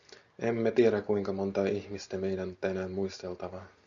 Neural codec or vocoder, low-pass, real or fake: none; 7.2 kHz; real